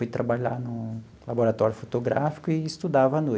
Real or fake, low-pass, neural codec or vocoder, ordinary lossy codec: real; none; none; none